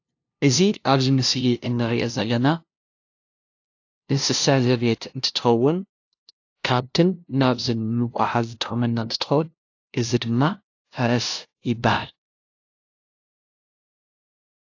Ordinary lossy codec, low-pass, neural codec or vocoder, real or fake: AAC, 48 kbps; 7.2 kHz; codec, 16 kHz, 0.5 kbps, FunCodec, trained on LibriTTS, 25 frames a second; fake